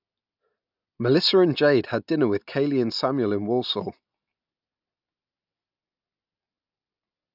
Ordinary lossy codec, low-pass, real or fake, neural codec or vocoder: none; 5.4 kHz; real; none